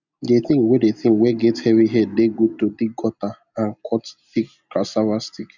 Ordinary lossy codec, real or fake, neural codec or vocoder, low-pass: none; real; none; 7.2 kHz